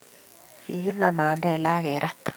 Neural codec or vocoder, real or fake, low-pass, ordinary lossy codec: codec, 44.1 kHz, 2.6 kbps, SNAC; fake; none; none